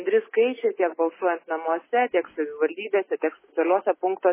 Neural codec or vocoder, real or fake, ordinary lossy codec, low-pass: none; real; MP3, 16 kbps; 3.6 kHz